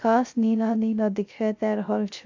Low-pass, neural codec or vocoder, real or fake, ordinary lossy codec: 7.2 kHz; codec, 16 kHz, 0.3 kbps, FocalCodec; fake; AAC, 48 kbps